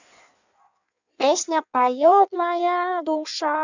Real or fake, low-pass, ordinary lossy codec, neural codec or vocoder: fake; 7.2 kHz; none; codec, 16 kHz in and 24 kHz out, 1.1 kbps, FireRedTTS-2 codec